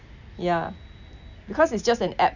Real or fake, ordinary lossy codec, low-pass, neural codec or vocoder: real; none; 7.2 kHz; none